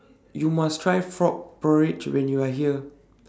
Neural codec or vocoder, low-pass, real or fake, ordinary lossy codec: none; none; real; none